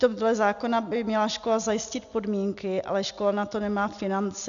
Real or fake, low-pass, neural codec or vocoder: real; 7.2 kHz; none